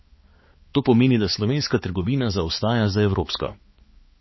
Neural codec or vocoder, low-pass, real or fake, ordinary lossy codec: codec, 16 kHz, 4 kbps, X-Codec, HuBERT features, trained on balanced general audio; 7.2 kHz; fake; MP3, 24 kbps